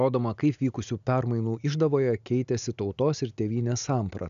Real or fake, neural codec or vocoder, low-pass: real; none; 7.2 kHz